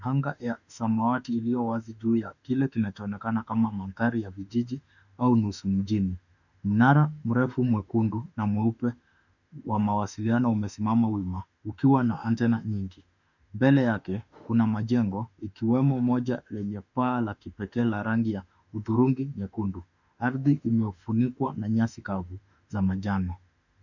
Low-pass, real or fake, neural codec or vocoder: 7.2 kHz; fake; autoencoder, 48 kHz, 32 numbers a frame, DAC-VAE, trained on Japanese speech